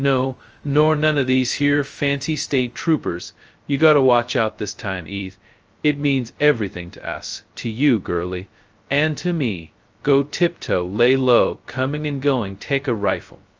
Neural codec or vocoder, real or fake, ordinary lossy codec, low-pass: codec, 16 kHz, 0.2 kbps, FocalCodec; fake; Opus, 24 kbps; 7.2 kHz